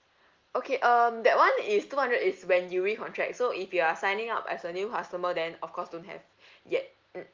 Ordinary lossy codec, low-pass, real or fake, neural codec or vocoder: Opus, 32 kbps; 7.2 kHz; real; none